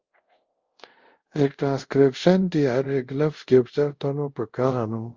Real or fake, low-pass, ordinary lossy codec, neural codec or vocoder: fake; 7.2 kHz; Opus, 32 kbps; codec, 24 kHz, 0.5 kbps, DualCodec